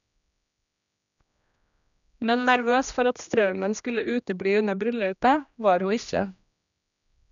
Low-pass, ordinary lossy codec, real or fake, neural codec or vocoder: 7.2 kHz; none; fake; codec, 16 kHz, 1 kbps, X-Codec, HuBERT features, trained on general audio